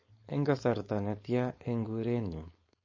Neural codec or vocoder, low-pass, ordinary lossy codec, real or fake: codec, 16 kHz, 4.8 kbps, FACodec; 7.2 kHz; MP3, 32 kbps; fake